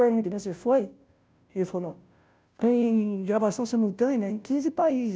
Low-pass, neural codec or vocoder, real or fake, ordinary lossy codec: none; codec, 16 kHz, 0.5 kbps, FunCodec, trained on Chinese and English, 25 frames a second; fake; none